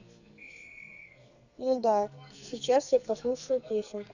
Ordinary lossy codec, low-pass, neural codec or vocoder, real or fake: Opus, 64 kbps; 7.2 kHz; codec, 44.1 kHz, 2.6 kbps, SNAC; fake